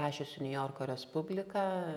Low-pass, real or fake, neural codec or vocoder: 19.8 kHz; fake; vocoder, 48 kHz, 128 mel bands, Vocos